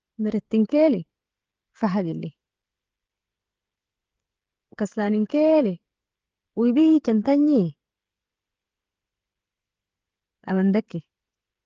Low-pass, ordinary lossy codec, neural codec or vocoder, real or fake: 7.2 kHz; Opus, 16 kbps; codec, 16 kHz, 16 kbps, FreqCodec, smaller model; fake